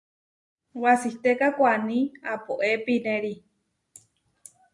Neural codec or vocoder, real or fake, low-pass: none; real; 10.8 kHz